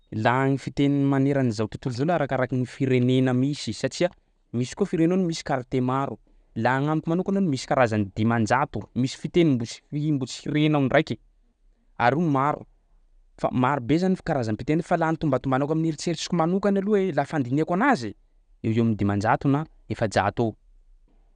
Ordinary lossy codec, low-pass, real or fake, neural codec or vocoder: none; 9.9 kHz; real; none